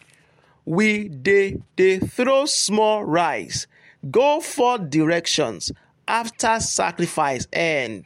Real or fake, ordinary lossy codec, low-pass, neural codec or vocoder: real; MP3, 64 kbps; 19.8 kHz; none